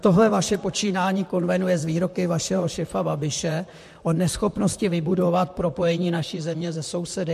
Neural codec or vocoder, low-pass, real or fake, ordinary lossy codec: vocoder, 44.1 kHz, 128 mel bands, Pupu-Vocoder; 14.4 kHz; fake; MP3, 64 kbps